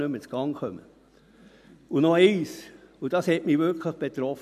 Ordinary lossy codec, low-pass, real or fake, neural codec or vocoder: none; 14.4 kHz; real; none